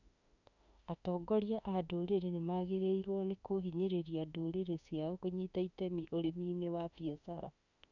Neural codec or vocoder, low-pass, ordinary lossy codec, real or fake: autoencoder, 48 kHz, 32 numbers a frame, DAC-VAE, trained on Japanese speech; 7.2 kHz; none; fake